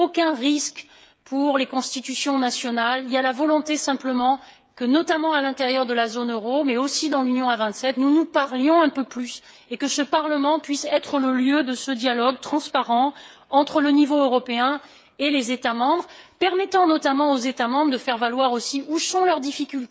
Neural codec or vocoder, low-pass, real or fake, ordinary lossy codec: codec, 16 kHz, 8 kbps, FreqCodec, smaller model; none; fake; none